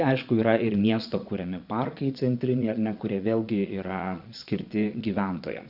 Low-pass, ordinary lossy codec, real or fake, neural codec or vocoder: 5.4 kHz; Opus, 64 kbps; fake; vocoder, 22.05 kHz, 80 mel bands, Vocos